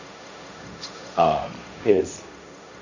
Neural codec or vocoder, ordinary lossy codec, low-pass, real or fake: codec, 16 kHz, 1.1 kbps, Voila-Tokenizer; none; 7.2 kHz; fake